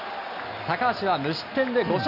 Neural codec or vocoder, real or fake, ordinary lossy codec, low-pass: none; real; none; 5.4 kHz